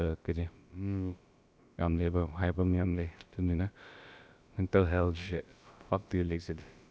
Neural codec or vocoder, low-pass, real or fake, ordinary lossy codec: codec, 16 kHz, about 1 kbps, DyCAST, with the encoder's durations; none; fake; none